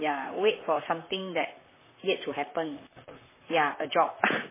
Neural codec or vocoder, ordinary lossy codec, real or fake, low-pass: vocoder, 44.1 kHz, 128 mel bands, Pupu-Vocoder; MP3, 16 kbps; fake; 3.6 kHz